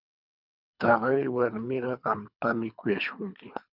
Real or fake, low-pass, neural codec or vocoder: fake; 5.4 kHz; codec, 24 kHz, 3 kbps, HILCodec